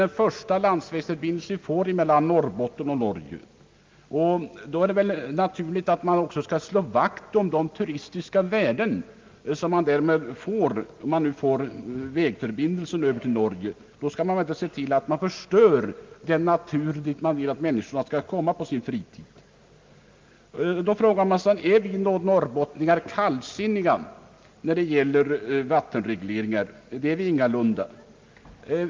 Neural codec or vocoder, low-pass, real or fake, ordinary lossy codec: none; 7.2 kHz; real; Opus, 24 kbps